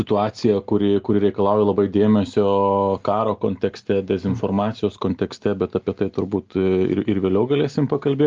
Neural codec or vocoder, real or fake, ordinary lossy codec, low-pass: none; real; Opus, 32 kbps; 7.2 kHz